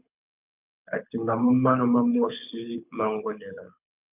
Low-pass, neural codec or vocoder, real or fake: 3.6 kHz; codec, 24 kHz, 6 kbps, HILCodec; fake